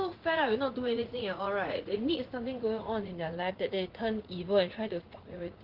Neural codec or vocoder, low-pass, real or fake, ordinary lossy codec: vocoder, 44.1 kHz, 80 mel bands, Vocos; 5.4 kHz; fake; Opus, 16 kbps